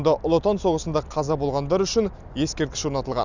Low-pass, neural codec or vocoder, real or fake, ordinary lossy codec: 7.2 kHz; none; real; none